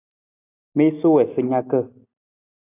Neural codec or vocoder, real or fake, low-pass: none; real; 3.6 kHz